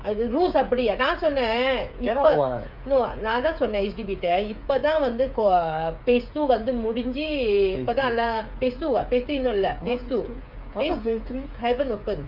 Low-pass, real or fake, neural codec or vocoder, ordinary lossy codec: 5.4 kHz; fake; codec, 16 kHz, 8 kbps, FreqCodec, smaller model; none